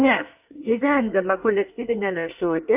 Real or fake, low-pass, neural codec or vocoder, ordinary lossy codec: fake; 3.6 kHz; codec, 24 kHz, 1 kbps, SNAC; AAC, 32 kbps